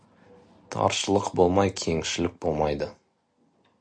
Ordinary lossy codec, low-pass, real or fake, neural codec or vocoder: AAC, 64 kbps; 9.9 kHz; real; none